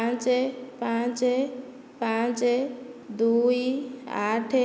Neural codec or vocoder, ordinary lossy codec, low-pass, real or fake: none; none; none; real